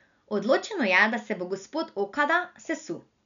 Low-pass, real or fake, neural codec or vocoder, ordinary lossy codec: 7.2 kHz; real; none; none